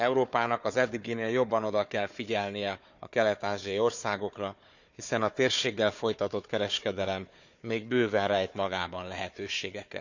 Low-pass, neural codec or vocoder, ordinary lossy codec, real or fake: 7.2 kHz; codec, 16 kHz, 4 kbps, FunCodec, trained on Chinese and English, 50 frames a second; none; fake